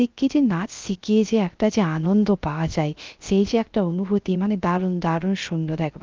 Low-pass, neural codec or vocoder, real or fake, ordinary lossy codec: 7.2 kHz; codec, 16 kHz, 0.3 kbps, FocalCodec; fake; Opus, 24 kbps